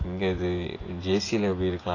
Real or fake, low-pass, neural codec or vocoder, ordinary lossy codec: fake; 7.2 kHz; vocoder, 24 kHz, 100 mel bands, Vocos; AAC, 32 kbps